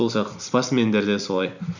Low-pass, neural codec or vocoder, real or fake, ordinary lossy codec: 7.2 kHz; vocoder, 44.1 kHz, 128 mel bands every 256 samples, BigVGAN v2; fake; none